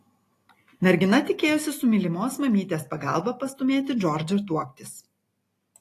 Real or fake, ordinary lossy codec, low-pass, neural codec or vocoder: real; AAC, 48 kbps; 14.4 kHz; none